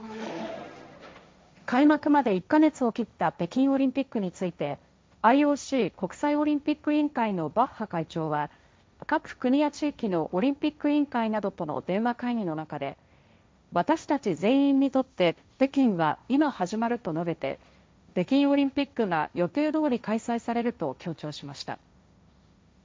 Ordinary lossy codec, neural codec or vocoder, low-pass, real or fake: none; codec, 16 kHz, 1.1 kbps, Voila-Tokenizer; none; fake